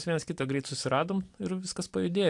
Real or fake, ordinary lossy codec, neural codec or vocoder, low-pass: real; AAC, 64 kbps; none; 10.8 kHz